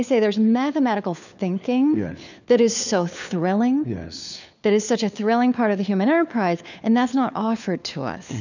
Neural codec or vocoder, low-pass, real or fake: codec, 16 kHz, 4 kbps, FunCodec, trained on LibriTTS, 50 frames a second; 7.2 kHz; fake